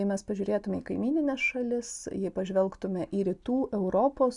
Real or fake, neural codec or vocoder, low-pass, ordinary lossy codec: real; none; 10.8 kHz; MP3, 96 kbps